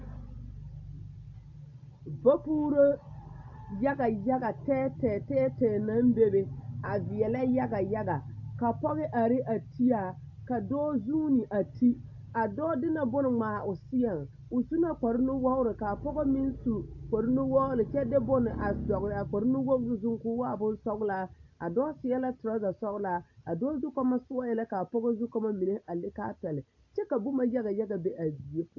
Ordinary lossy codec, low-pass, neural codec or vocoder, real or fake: AAC, 48 kbps; 7.2 kHz; none; real